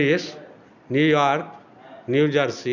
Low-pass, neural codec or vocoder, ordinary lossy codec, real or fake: 7.2 kHz; none; none; real